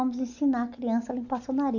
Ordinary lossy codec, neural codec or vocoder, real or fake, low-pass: none; none; real; 7.2 kHz